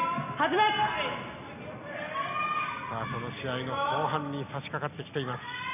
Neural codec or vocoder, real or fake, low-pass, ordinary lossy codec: none; real; 3.6 kHz; none